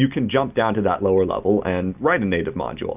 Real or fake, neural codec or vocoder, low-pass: real; none; 3.6 kHz